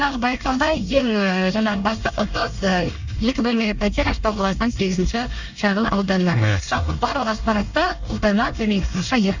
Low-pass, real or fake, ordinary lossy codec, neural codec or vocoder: 7.2 kHz; fake; Opus, 64 kbps; codec, 24 kHz, 1 kbps, SNAC